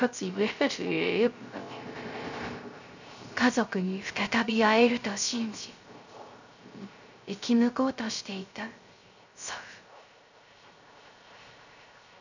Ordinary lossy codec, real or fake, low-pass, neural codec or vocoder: none; fake; 7.2 kHz; codec, 16 kHz, 0.3 kbps, FocalCodec